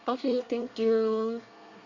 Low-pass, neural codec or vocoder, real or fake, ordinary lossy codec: 7.2 kHz; codec, 24 kHz, 1 kbps, SNAC; fake; none